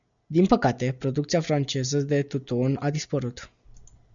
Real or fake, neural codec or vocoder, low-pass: real; none; 7.2 kHz